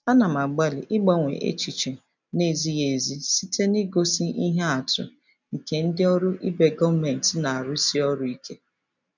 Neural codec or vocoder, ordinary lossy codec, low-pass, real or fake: none; none; 7.2 kHz; real